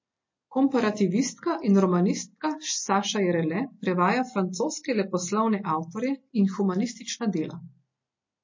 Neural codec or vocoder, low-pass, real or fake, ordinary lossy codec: none; 7.2 kHz; real; MP3, 32 kbps